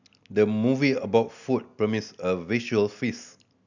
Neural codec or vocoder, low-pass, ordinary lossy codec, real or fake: none; 7.2 kHz; none; real